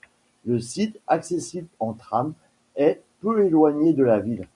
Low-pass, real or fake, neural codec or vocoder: 10.8 kHz; real; none